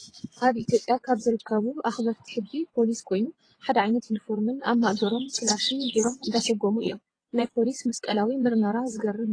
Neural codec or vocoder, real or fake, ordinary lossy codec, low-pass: vocoder, 22.05 kHz, 80 mel bands, WaveNeXt; fake; AAC, 32 kbps; 9.9 kHz